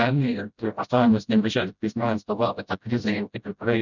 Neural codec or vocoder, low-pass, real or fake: codec, 16 kHz, 0.5 kbps, FreqCodec, smaller model; 7.2 kHz; fake